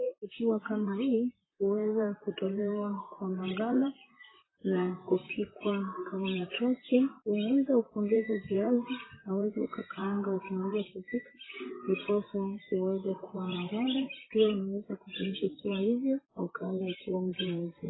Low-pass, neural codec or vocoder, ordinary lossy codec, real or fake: 7.2 kHz; vocoder, 44.1 kHz, 128 mel bands, Pupu-Vocoder; AAC, 16 kbps; fake